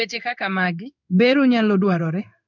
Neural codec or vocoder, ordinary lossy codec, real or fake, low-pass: codec, 16 kHz in and 24 kHz out, 1 kbps, XY-Tokenizer; none; fake; 7.2 kHz